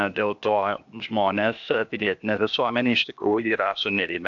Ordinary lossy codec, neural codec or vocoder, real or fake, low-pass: AAC, 64 kbps; codec, 16 kHz, 0.8 kbps, ZipCodec; fake; 7.2 kHz